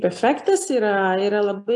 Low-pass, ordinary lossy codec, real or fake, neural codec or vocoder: 10.8 kHz; AAC, 64 kbps; real; none